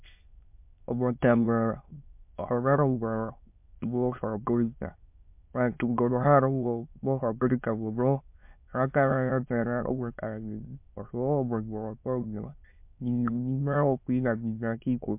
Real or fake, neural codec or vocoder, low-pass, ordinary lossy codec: fake; autoencoder, 22.05 kHz, a latent of 192 numbers a frame, VITS, trained on many speakers; 3.6 kHz; MP3, 32 kbps